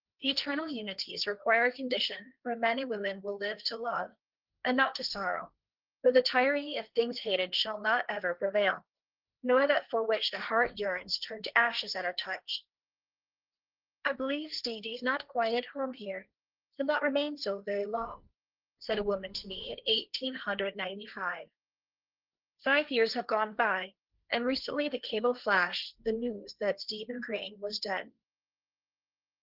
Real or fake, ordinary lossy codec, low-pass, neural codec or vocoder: fake; Opus, 24 kbps; 5.4 kHz; codec, 16 kHz, 1.1 kbps, Voila-Tokenizer